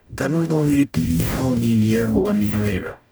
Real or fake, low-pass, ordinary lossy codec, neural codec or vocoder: fake; none; none; codec, 44.1 kHz, 0.9 kbps, DAC